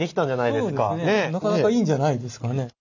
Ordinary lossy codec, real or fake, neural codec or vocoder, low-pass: none; real; none; 7.2 kHz